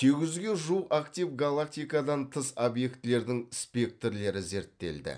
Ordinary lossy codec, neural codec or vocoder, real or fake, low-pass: none; none; real; 9.9 kHz